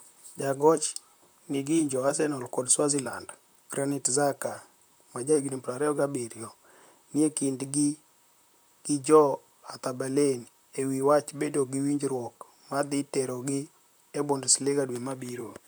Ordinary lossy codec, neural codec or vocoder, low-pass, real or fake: none; vocoder, 44.1 kHz, 128 mel bands, Pupu-Vocoder; none; fake